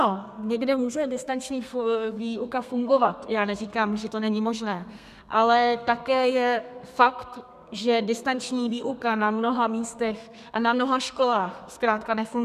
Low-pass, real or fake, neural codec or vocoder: 14.4 kHz; fake; codec, 32 kHz, 1.9 kbps, SNAC